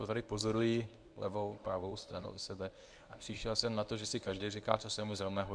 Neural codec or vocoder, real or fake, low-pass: codec, 24 kHz, 0.9 kbps, WavTokenizer, medium speech release version 1; fake; 9.9 kHz